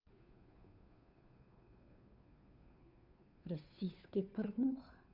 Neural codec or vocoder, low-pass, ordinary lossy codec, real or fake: codec, 16 kHz, 2 kbps, FunCodec, trained on Chinese and English, 25 frames a second; 5.4 kHz; none; fake